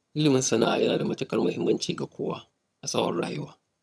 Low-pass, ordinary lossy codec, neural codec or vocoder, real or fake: none; none; vocoder, 22.05 kHz, 80 mel bands, HiFi-GAN; fake